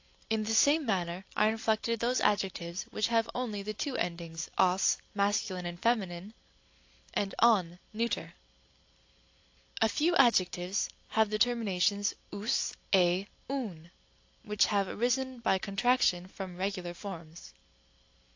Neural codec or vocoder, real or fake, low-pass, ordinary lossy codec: none; real; 7.2 kHz; AAC, 48 kbps